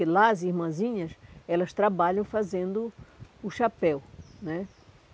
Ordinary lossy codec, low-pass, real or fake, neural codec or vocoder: none; none; real; none